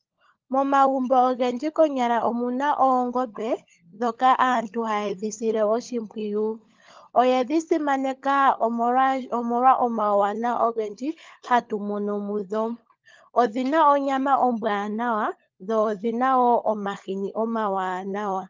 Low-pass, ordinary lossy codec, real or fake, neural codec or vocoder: 7.2 kHz; Opus, 24 kbps; fake; codec, 16 kHz, 16 kbps, FunCodec, trained on LibriTTS, 50 frames a second